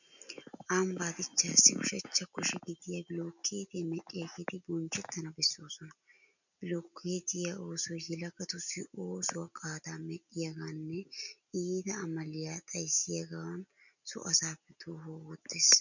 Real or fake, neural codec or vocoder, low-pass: real; none; 7.2 kHz